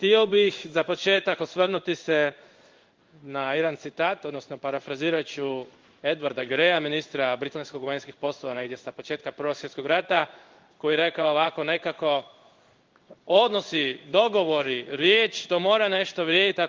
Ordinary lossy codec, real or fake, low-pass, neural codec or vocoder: Opus, 32 kbps; fake; 7.2 kHz; codec, 16 kHz in and 24 kHz out, 1 kbps, XY-Tokenizer